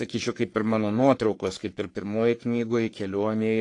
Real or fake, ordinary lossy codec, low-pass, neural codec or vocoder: fake; AAC, 48 kbps; 10.8 kHz; codec, 44.1 kHz, 3.4 kbps, Pupu-Codec